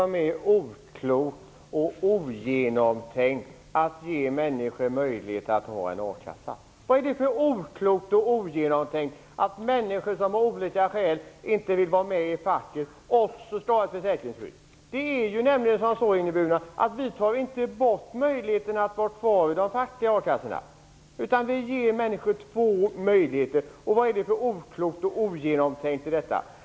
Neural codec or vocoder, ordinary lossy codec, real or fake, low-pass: none; none; real; none